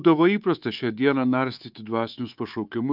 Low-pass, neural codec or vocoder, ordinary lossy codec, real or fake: 5.4 kHz; codec, 24 kHz, 3.1 kbps, DualCodec; Opus, 24 kbps; fake